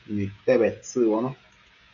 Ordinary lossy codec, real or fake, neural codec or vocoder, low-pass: MP3, 64 kbps; fake; codec, 16 kHz, 16 kbps, FreqCodec, smaller model; 7.2 kHz